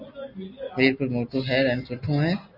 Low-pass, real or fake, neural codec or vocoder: 5.4 kHz; real; none